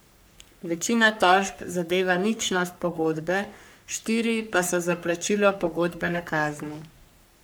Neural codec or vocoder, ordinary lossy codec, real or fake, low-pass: codec, 44.1 kHz, 3.4 kbps, Pupu-Codec; none; fake; none